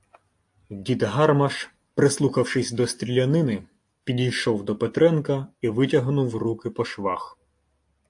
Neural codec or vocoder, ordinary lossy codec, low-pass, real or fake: none; AAC, 64 kbps; 10.8 kHz; real